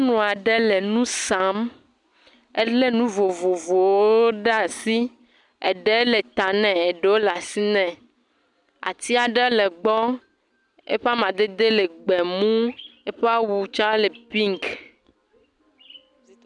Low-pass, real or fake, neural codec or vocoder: 10.8 kHz; real; none